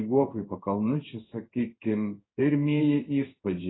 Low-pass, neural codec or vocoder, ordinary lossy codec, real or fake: 7.2 kHz; codec, 16 kHz in and 24 kHz out, 1 kbps, XY-Tokenizer; AAC, 16 kbps; fake